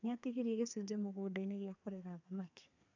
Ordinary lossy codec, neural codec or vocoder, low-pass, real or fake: none; codec, 32 kHz, 1.9 kbps, SNAC; 7.2 kHz; fake